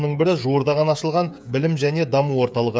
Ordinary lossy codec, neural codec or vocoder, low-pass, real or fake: none; codec, 16 kHz, 16 kbps, FreqCodec, smaller model; none; fake